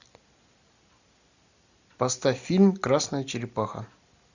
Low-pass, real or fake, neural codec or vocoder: 7.2 kHz; real; none